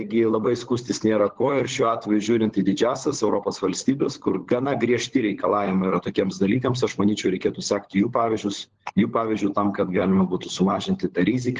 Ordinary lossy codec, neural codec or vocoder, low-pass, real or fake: Opus, 16 kbps; codec, 16 kHz, 16 kbps, FunCodec, trained on LibriTTS, 50 frames a second; 7.2 kHz; fake